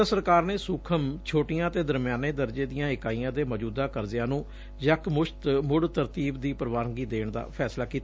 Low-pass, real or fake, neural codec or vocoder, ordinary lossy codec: none; real; none; none